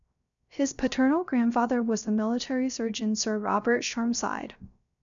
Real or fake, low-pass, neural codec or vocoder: fake; 7.2 kHz; codec, 16 kHz, 0.3 kbps, FocalCodec